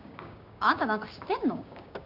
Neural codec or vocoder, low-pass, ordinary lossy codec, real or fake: codec, 16 kHz, 6 kbps, DAC; 5.4 kHz; none; fake